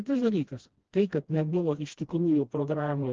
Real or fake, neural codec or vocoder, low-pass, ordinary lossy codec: fake; codec, 16 kHz, 1 kbps, FreqCodec, smaller model; 7.2 kHz; Opus, 16 kbps